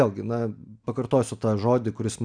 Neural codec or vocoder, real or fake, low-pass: none; real; 9.9 kHz